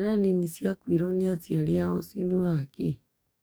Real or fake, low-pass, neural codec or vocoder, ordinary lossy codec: fake; none; codec, 44.1 kHz, 2.6 kbps, DAC; none